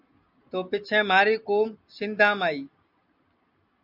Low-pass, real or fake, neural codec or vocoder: 5.4 kHz; real; none